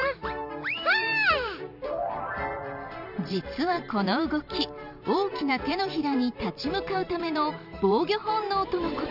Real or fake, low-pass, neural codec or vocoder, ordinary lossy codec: fake; 5.4 kHz; vocoder, 44.1 kHz, 128 mel bands every 512 samples, BigVGAN v2; none